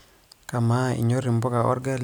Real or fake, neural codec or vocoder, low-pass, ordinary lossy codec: real; none; none; none